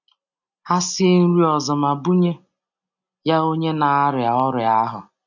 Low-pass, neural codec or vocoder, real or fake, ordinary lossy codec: 7.2 kHz; none; real; none